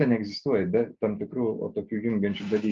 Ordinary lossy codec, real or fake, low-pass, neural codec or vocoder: Opus, 24 kbps; real; 7.2 kHz; none